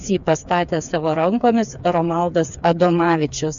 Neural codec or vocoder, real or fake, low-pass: codec, 16 kHz, 4 kbps, FreqCodec, smaller model; fake; 7.2 kHz